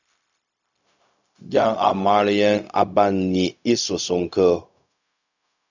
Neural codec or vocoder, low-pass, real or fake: codec, 16 kHz, 0.4 kbps, LongCat-Audio-Codec; 7.2 kHz; fake